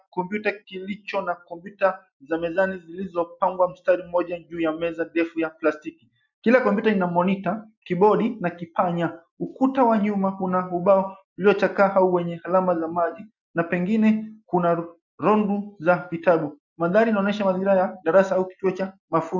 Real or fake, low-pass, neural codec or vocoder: real; 7.2 kHz; none